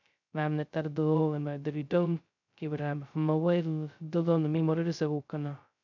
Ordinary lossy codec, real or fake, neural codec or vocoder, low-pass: none; fake; codec, 16 kHz, 0.2 kbps, FocalCodec; 7.2 kHz